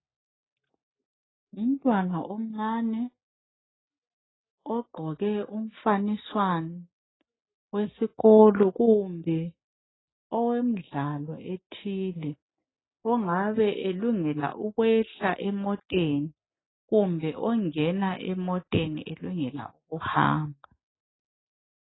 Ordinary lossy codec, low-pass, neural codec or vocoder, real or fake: AAC, 16 kbps; 7.2 kHz; none; real